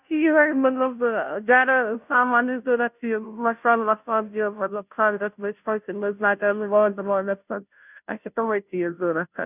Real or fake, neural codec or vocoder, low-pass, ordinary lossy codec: fake; codec, 16 kHz, 0.5 kbps, FunCodec, trained on Chinese and English, 25 frames a second; 3.6 kHz; none